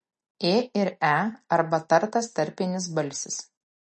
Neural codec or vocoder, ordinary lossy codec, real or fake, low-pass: none; MP3, 32 kbps; real; 10.8 kHz